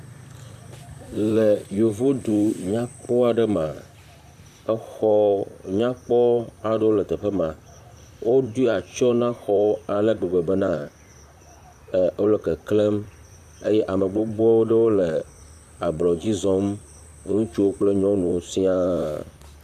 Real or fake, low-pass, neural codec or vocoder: fake; 14.4 kHz; vocoder, 44.1 kHz, 128 mel bands, Pupu-Vocoder